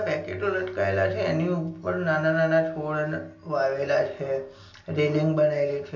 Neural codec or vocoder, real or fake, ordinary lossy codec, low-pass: none; real; none; 7.2 kHz